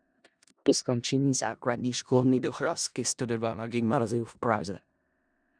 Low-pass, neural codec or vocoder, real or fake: 9.9 kHz; codec, 16 kHz in and 24 kHz out, 0.4 kbps, LongCat-Audio-Codec, four codebook decoder; fake